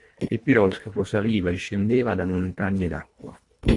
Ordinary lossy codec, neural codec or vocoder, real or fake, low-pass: AAC, 48 kbps; codec, 24 kHz, 1.5 kbps, HILCodec; fake; 10.8 kHz